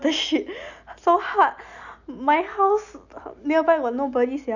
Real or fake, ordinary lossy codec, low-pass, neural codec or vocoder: real; none; 7.2 kHz; none